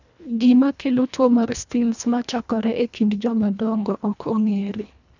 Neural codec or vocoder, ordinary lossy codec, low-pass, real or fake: codec, 24 kHz, 1.5 kbps, HILCodec; none; 7.2 kHz; fake